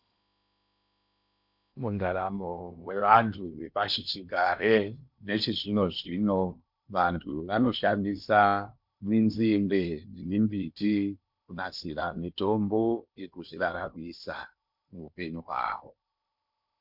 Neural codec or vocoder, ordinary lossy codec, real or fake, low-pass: codec, 16 kHz in and 24 kHz out, 0.8 kbps, FocalCodec, streaming, 65536 codes; MP3, 48 kbps; fake; 5.4 kHz